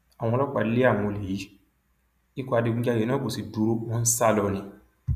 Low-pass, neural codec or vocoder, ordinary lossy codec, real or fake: 14.4 kHz; none; none; real